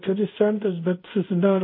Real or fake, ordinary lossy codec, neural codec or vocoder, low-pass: fake; MP3, 32 kbps; codec, 24 kHz, 0.5 kbps, DualCodec; 5.4 kHz